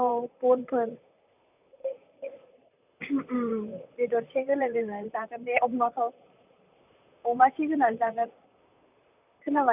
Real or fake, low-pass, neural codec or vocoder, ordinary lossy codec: fake; 3.6 kHz; vocoder, 44.1 kHz, 128 mel bands, Pupu-Vocoder; none